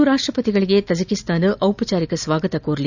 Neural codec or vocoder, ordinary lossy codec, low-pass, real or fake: none; none; 7.2 kHz; real